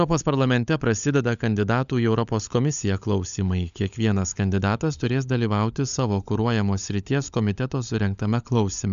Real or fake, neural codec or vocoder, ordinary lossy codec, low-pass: fake; codec, 16 kHz, 16 kbps, FunCodec, trained on LibriTTS, 50 frames a second; MP3, 96 kbps; 7.2 kHz